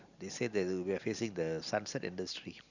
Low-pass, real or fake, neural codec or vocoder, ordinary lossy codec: 7.2 kHz; real; none; none